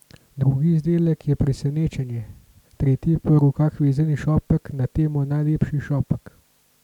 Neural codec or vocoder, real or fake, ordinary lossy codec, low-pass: none; real; none; 19.8 kHz